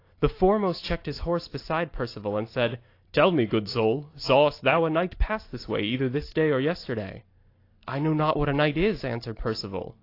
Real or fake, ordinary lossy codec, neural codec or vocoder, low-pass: real; AAC, 32 kbps; none; 5.4 kHz